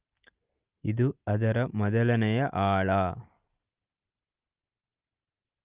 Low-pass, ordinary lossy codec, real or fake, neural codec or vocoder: 3.6 kHz; Opus, 32 kbps; real; none